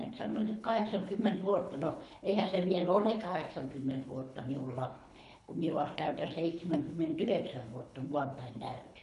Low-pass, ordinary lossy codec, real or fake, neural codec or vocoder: 10.8 kHz; none; fake; codec, 24 kHz, 3 kbps, HILCodec